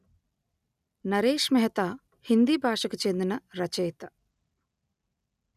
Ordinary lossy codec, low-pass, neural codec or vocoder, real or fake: none; 14.4 kHz; none; real